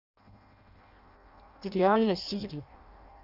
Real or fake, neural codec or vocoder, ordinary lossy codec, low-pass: fake; codec, 16 kHz in and 24 kHz out, 0.6 kbps, FireRedTTS-2 codec; none; 5.4 kHz